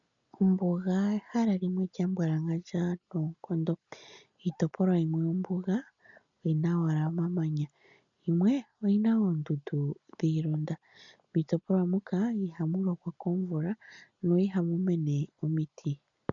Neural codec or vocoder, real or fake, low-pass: none; real; 7.2 kHz